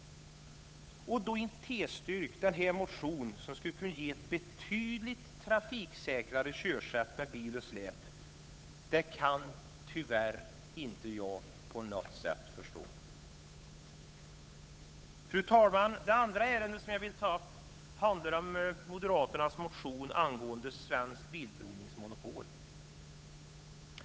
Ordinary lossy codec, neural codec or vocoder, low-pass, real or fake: none; codec, 16 kHz, 8 kbps, FunCodec, trained on Chinese and English, 25 frames a second; none; fake